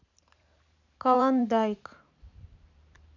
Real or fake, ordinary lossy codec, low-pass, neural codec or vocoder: fake; none; 7.2 kHz; vocoder, 44.1 kHz, 128 mel bands every 512 samples, BigVGAN v2